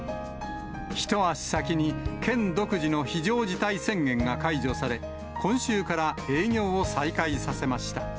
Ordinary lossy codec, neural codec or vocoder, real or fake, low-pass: none; none; real; none